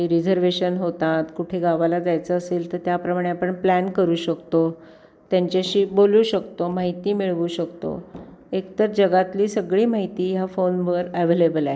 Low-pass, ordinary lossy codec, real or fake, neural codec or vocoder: none; none; real; none